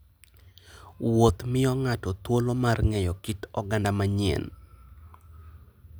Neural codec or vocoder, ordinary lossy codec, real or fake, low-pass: none; none; real; none